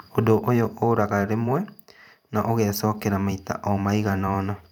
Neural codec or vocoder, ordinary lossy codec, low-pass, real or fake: vocoder, 44.1 kHz, 128 mel bands every 512 samples, BigVGAN v2; none; 19.8 kHz; fake